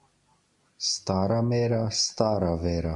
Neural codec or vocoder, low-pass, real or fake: none; 10.8 kHz; real